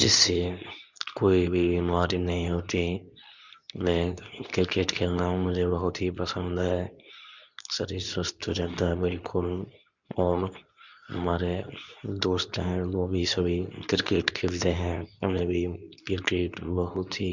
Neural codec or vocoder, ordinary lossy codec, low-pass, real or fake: codec, 24 kHz, 0.9 kbps, WavTokenizer, medium speech release version 2; none; 7.2 kHz; fake